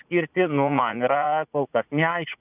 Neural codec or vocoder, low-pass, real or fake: vocoder, 44.1 kHz, 80 mel bands, Vocos; 3.6 kHz; fake